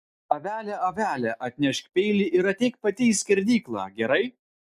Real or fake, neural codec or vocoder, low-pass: real; none; 14.4 kHz